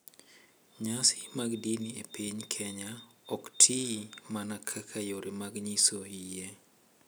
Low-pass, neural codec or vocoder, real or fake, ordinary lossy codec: none; none; real; none